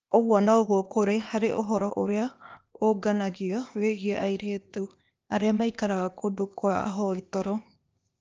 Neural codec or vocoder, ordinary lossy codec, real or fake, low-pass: codec, 16 kHz, 0.8 kbps, ZipCodec; Opus, 24 kbps; fake; 7.2 kHz